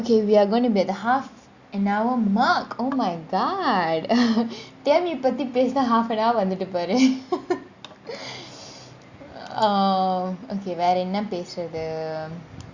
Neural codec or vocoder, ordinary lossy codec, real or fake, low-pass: none; Opus, 64 kbps; real; 7.2 kHz